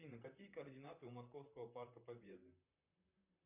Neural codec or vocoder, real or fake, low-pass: vocoder, 44.1 kHz, 128 mel bands, Pupu-Vocoder; fake; 3.6 kHz